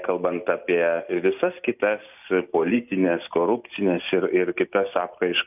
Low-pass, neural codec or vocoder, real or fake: 3.6 kHz; none; real